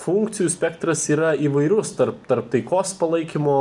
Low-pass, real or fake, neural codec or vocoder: 10.8 kHz; real; none